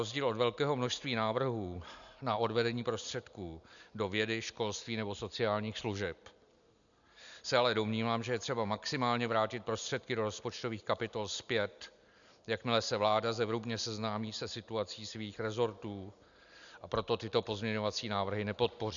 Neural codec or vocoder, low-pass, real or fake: none; 7.2 kHz; real